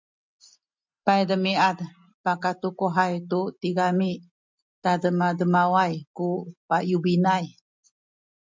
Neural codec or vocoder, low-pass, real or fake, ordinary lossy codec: none; 7.2 kHz; real; MP3, 64 kbps